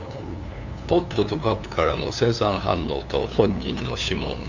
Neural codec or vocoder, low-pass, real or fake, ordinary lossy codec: codec, 16 kHz, 2 kbps, FunCodec, trained on LibriTTS, 25 frames a second; 7.2 kHz; fake; none